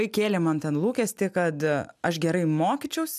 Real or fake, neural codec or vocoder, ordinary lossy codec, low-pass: real; none; MP3, 96 kbps; 14.4 kHz